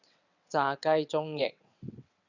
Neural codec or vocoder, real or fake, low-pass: vocoder, 22.05 kHz, 80 mel bands, WaveNeXt; fake; 7.2 kHz